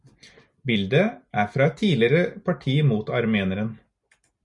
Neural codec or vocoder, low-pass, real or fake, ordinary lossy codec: none; 10.8 kHz; real; MP3, 96 kbps